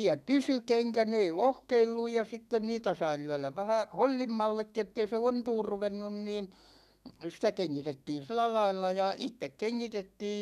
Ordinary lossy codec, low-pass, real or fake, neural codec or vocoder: none; 14.4 kHz; fake; codec, 32 kHz, 1.9 kbps, SNAC